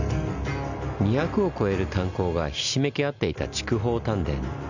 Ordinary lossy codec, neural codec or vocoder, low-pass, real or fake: none; none; 7.2 kHz; real